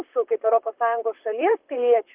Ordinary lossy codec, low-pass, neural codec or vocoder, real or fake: Opus, 24 kbps; 3.6 kHz; vocoder, 44.1 kHz, 128 mel bands, Pupu-Vocoder; fake